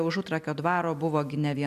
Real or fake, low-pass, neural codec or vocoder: real; 14.4 kHz; none